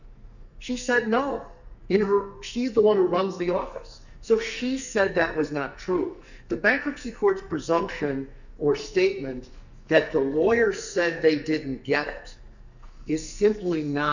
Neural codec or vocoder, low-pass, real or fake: codec, 44.1 kHz, 2.6 kbps, SNAC; 7.2 kHz; fake